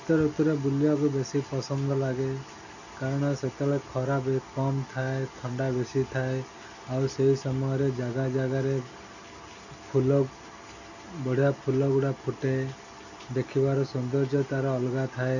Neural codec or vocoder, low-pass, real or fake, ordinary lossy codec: none; 7.2 kHz; real; none